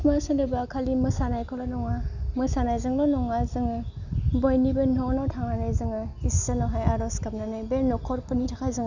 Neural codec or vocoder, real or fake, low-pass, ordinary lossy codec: none; real; 7.2 kHz; none